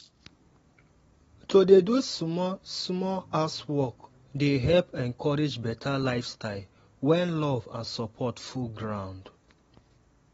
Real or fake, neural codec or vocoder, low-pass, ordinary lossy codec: real; none; 19.8 kHz; AAC, 24 kbps